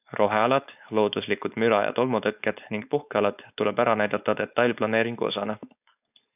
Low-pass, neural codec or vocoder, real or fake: 3.6 kHz; codec, 16 kHz, 4.8 kbps, FACodec; fake